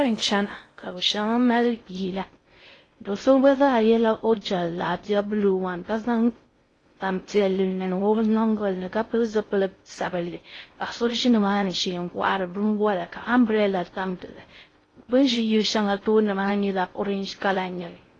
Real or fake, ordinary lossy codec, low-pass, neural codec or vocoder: fake; AAC, 32 kbps; 9.9 kHz; codec, 16 kHz in and 24 kHz out, 0.6 kbps, FocalCodec, streaming, 2048 codes